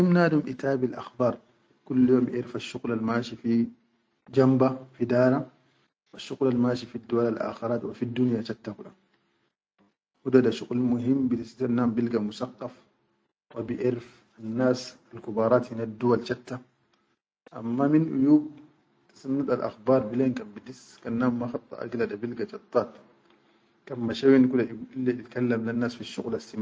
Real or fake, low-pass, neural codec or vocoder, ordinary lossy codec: real; none; none; none